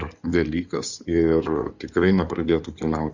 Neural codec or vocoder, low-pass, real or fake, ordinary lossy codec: codec, 16 kHz in and 24 kHz out, 2.2 kbps, FireRedTTS-2 codec; 7.2 kHz; fake; Opus, 64 kbps